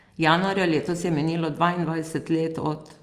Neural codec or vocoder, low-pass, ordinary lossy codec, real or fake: none; 14.4 kHz; Opus, 32 kbps; real